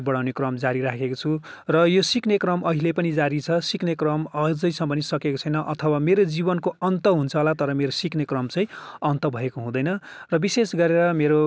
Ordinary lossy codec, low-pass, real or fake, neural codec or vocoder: none; none; real; none